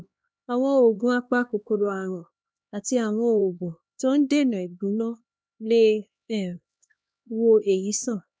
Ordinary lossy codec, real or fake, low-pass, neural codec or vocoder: none; fake; none; codec, 16 kHz, 2 kbps, X-Codec, HuBERT features, trained on LibriSpeech